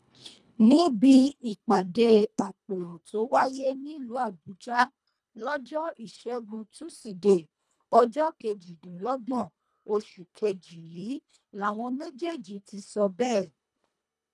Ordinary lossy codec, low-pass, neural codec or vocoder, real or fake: none; none; codec, 24 kHz, 1.5 kbps, HILCodec; fake